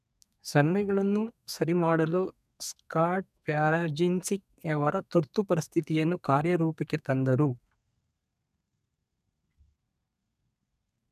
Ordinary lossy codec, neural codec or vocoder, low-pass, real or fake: none; codec, 32 kHz, 1.9 kbps, SNAC; 14.4 kHz; fake